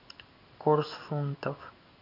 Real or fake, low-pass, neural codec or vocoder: fake; 5.4 kHz; codec, 16 kHz in and 24 kHz out, 1 kbps, XY-Tokenizer